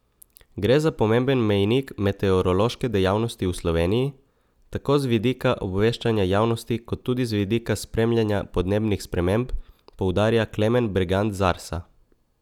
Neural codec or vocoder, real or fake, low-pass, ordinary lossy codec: none; real; 19.8 kHz; none